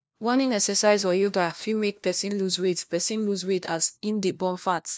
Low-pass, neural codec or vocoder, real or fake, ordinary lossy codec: none; codec, 16 kHz, 1 kbps, FunCodec, trained on LibriTTS, 50 frames a second; fake; none